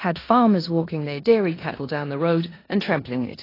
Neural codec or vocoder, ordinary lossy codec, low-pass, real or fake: codec, 16 kHz in and 24 kHz out, 0.9 kbps, LongCat-Audio-Codec, four codebook decoder; AAC, 24 kbps; 5.4 kHz; fake